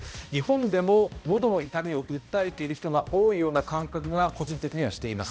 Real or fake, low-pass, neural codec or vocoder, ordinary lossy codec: fake; none; codec, 16 kHz, 1 kbps, X-Codec, HuBERT features, trained on balanced general audio; none